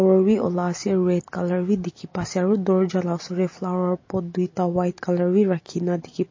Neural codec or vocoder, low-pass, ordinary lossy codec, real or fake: none; 7.2 kHz; MP3, 32 kbps; real